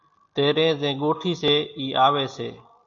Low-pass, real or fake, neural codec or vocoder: 7.2 kHz; real; none